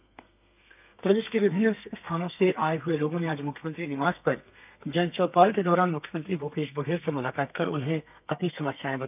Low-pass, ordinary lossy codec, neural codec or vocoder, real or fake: 3.6 kHz; none; codec, 32 kHz, 1.9 kbps, SNAC; fake